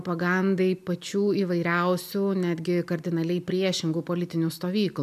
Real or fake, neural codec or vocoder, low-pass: real; none; 14.4 kHz